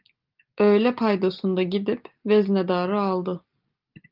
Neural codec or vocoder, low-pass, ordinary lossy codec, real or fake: none; 5.4 kHz; Opus, 32 kbps; real